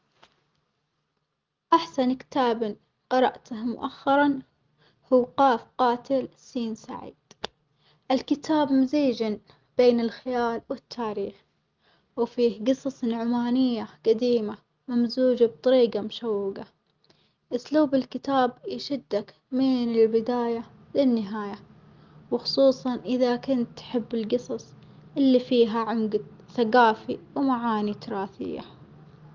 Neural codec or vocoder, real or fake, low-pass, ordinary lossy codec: none; real; 7.2 kHz; Opus, 32 kbps